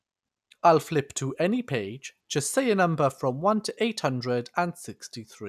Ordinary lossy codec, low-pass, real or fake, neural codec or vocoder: none; 14.4 kHz; real; none